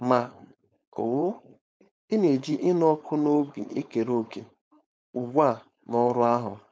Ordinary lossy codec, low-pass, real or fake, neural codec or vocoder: none; none; fake; codec, 16 kHz, 4.8 kbps, FACodec